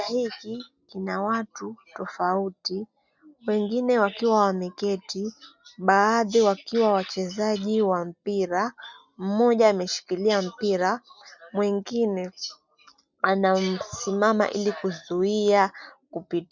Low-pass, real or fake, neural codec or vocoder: 7.2 kHz; real; none